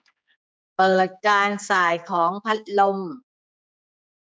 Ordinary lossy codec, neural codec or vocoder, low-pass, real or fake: none; codec, 16 kHz, 4 kbps, X-Codec, HuBERT features, trained on general audio; none; fake